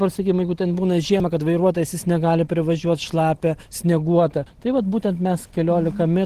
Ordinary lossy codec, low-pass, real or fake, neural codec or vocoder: Opus, 16 kbps; 14.4 kHz; real; none